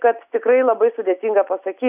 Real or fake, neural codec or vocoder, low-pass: real; none; 3.6 kHz